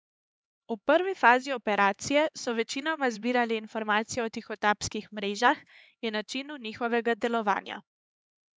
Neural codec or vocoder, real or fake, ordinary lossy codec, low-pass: codec, 16 kHz, 4 kbps, X-Codec, HuBERT features, trained on LibriSpeech; fake; none; none